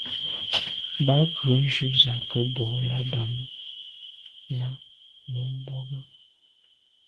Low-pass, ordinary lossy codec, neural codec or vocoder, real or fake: 10.8 kHz; Opus, 16 kbps; autoencoder, 48 kHz, 32 numbers a frame, DAC-VAE, trained on Japanese speech; fake